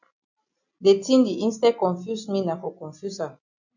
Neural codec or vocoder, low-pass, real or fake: none; 7.2 kHz; real